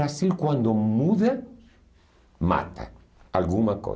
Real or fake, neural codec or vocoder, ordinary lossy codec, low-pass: real; none; none; none